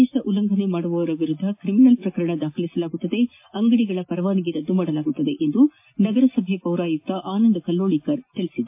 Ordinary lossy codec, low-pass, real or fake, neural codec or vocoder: MP3, 24 kbps; 3.6 kHz; real; none